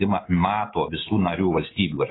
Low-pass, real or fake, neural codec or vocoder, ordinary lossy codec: 7.2 kHz; real; none; AAC, 16 kbps